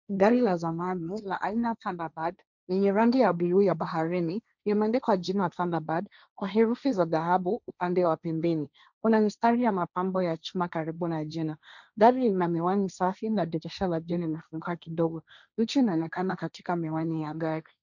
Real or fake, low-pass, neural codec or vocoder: fake; 7.2 kHz; codec, 16 kHz, 1.1 kbps, Voila-Tokenizer